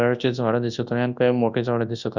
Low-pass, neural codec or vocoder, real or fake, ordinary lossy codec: 7.2 kHz; codec, 24 kHz, 0.9 kbps, WavTokenizer, large speech release; fake; Opus, 64 kbps